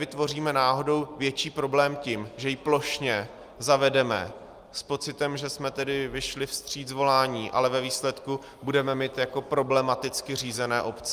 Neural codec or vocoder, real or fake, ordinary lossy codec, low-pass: none; real; Opus, 32 kbps; 14.4 kHz